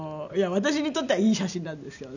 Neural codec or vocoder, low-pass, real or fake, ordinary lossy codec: none; 7.2 kHz; real; none